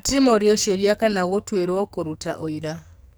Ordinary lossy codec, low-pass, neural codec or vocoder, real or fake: none; none; codec, 44.1 kHz, 2.6 kbps, SNAC; fake